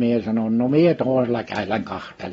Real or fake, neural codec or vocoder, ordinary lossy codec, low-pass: real; none; AAC, 24 kbps; 19.8 kHz